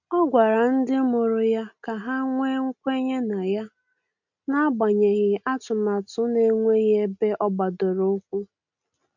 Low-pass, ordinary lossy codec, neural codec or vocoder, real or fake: 7.2 kHz; none; none; real